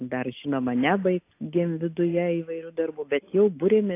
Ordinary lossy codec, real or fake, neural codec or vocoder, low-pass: AAC, 24 kbps; real; none; 3.6 kHz